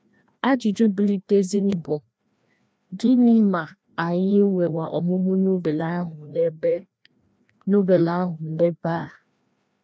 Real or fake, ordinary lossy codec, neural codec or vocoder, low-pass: fake; none; codec, 16 kHz, 1 kbps, FreqCodec, larger model; none